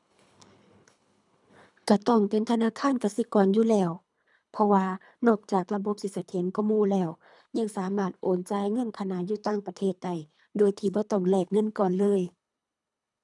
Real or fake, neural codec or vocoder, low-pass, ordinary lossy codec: fake; codec, 24 kHz, 3 kbps, HILCodec; 10.8 kHz; none